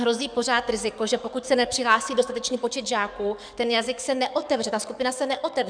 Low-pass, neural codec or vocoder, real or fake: 9.9 kHz; codec, 44.1 kHz, 7.8 kbps, DAC; fake